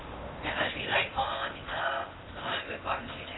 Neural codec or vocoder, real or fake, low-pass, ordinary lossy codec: codec, 16 kHz in and 24 kHz out, 0.8 kbps, FocalCodec, streaming, 65536 codes; fake; 7.2 kHz; AAC, 16 kbps